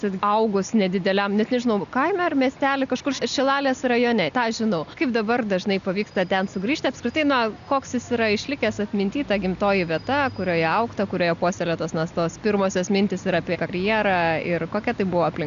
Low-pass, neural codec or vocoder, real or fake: 7.2 kHz; none; real